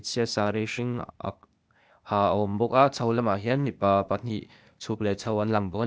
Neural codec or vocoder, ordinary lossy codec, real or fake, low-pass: codec, 16 kHz, 0.8 kbps, ZipCodec; none; fake; none